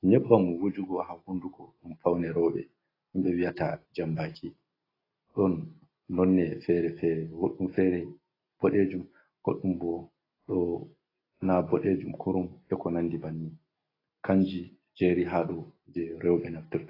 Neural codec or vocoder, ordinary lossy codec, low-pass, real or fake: none; AAC, 24 kbps; 5.4 kHz; real